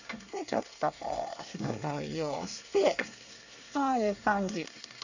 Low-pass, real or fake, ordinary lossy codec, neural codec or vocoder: 7.2 kHz; fake; none; codec, 24 kHz, 1 kbps, SNAC